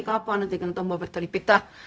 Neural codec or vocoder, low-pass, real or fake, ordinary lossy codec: codec, 16 kHz, 0.4 kbps, LongCat-Audio-Codec; none; fake; none